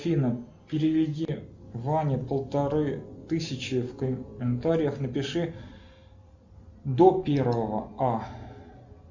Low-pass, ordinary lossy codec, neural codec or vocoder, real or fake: 7.2 kHz; Opus, 64 kbps; none; real